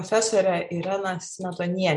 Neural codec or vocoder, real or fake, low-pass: none; real; 10.8 kHz